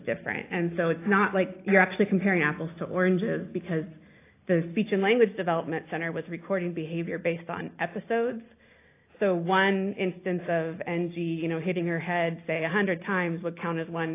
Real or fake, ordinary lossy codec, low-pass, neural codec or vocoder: real; AAC, 24 kbps; 3.6 kHz; none